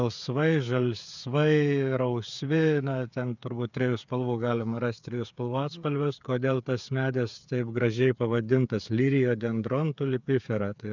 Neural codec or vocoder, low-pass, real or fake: codec, 16 kHz, 8 kbps, FreqCodec, smaller model; 7.2 kHz; fake